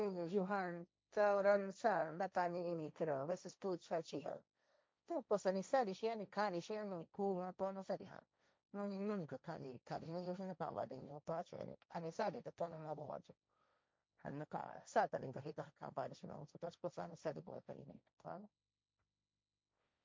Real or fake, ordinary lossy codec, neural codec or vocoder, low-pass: fake; none; codec, 16 kHz, 1.1 kbps, Voila-Tokenizer; none